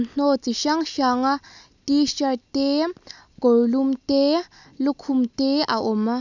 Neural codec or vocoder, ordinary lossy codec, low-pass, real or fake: none; none; 7.2 kHz; real